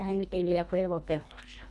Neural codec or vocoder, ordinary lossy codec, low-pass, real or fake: codec, 24 kHz, 1.5 kbps, HILCodec; none; none; fake